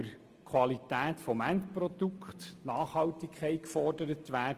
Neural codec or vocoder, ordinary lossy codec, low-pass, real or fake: none; Opus, 24 kbps; 14.4 kHz; real